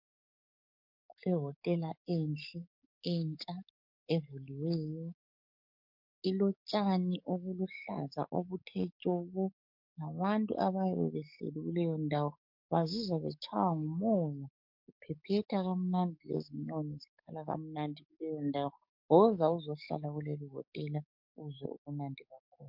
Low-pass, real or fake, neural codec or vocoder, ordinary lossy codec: 5.4 kHz; fake; codec, 44.1 kHz, 7.8 kbps, DAC; MP3, 48 kbps